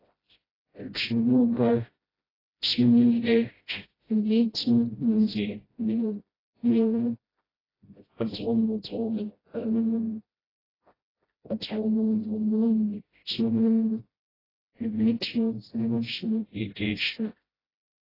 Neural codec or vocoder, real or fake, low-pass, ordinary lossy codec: codec, 16 kHz, 0.5 kbps, FreqCodec, smaller model; fake; 5.4 kHz; AAC, 24 kbps